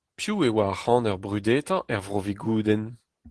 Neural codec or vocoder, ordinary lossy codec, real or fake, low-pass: none; Opus, 16 kbps; real; 10.8 kHz